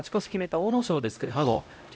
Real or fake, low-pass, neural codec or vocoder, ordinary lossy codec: fake; none; codec, 16 kHz, 0.5 kbps, X-Codec, HuBERT features, trained on LibriSpeech; none